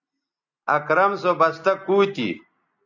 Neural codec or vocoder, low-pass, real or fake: none; 7.2 kHz; real